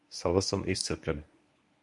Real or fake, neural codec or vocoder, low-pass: fake; codec, 24 kHz, 0.9 kbps, WavTokenizer, medium speech release version 1; 10.8 kHz